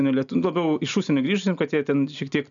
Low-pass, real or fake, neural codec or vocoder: 7.2 kHz; real; none